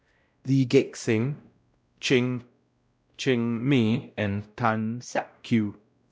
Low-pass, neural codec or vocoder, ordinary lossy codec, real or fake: none; codec, 16 kHz, 0.5 kbps, X-Codec, WavLM features, trained on Multilingual LibriSpeech; none; fake